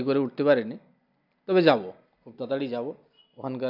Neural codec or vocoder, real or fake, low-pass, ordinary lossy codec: none; real; 5.4 kHz; none